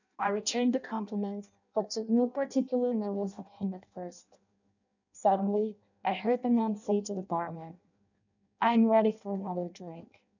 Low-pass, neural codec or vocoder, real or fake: 7.2 kHz; codec, 16 kHz in and 24 kHz out, 0.6 kbps, FireRedTTS-2 codec; fake